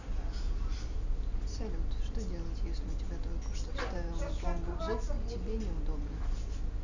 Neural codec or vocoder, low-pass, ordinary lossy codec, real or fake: none; 7.2 kHz; none; real